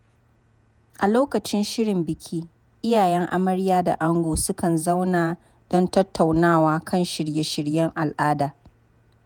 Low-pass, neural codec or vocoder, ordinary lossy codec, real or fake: none; vocoder, 48 kHz, 128 mel bands, Vocos; none; fake